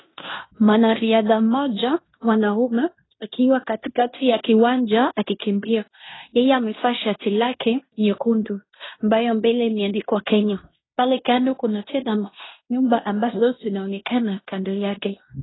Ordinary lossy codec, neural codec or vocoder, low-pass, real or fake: AAC, 16 kbps; codec, 16 kHz in and 24 kHz out, 0.9 kbps, LongCat-Audio-Codec, fine tuned four codebook decoder; 7.2 kHz; fake